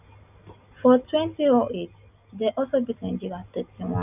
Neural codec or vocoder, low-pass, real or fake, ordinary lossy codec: none; 3.6 kHz; real; none